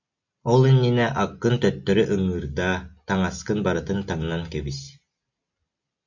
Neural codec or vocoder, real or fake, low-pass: none; real; 7.2 kHz